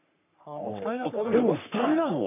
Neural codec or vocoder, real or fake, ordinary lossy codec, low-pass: codec, 44.1 kHz, 3.4 kbps, Pupu-Codec; fake; none; 3.6 kHz